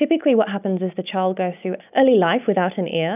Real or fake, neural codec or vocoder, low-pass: real; none; 3.6 kHz